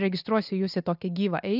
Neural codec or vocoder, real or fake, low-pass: none; real; 5.4 kHz